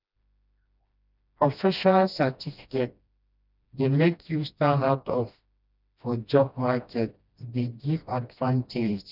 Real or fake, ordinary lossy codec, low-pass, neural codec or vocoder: fake; none; 5.4 kHz; codec, 16 kHz, 1 kbps, FreqCodec, smaller model